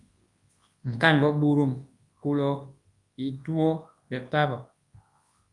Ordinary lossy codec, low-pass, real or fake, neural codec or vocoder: Opus, 32 kbps; 10.8 kHz; fake; codec, 24 kHz, 0.9 kbps, WavTokenizer, large speech release